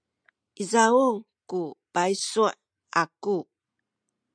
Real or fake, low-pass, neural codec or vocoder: real; 9.9 kHz; none